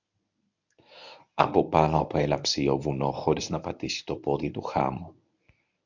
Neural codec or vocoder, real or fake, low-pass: codec, 24 kHz, 0.9 kbps, WavTokenizer, medium speech release version 1; fake; 7.2 kHz